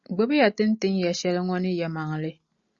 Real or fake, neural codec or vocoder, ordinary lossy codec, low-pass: real; none; Opus, 64 kbps; 7.2 kHz